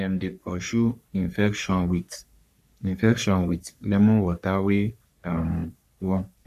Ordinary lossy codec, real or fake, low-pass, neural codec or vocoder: none; fake; 14.4 kHz; codec, 44.1 kHz, 3.4 kbps, Pupu-Codec